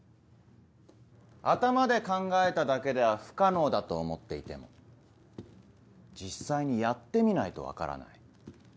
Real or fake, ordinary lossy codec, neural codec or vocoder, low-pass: real; none; none; none